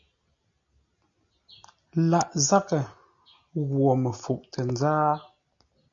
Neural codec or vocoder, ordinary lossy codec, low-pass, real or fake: none; AAC, 64 kbps; 7.2 kHz; real